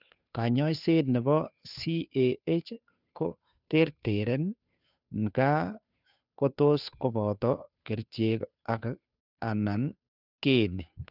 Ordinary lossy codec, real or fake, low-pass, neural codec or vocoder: none; fake; 5.4 kHz; codec, 16 kHz, 2 kbps, FunCodec, trained on Chinese and English, 25 frames a second